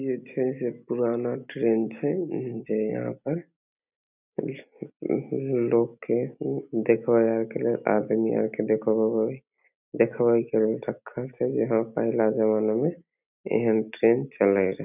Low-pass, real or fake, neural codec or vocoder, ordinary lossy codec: 3.6 kHz; real; none; none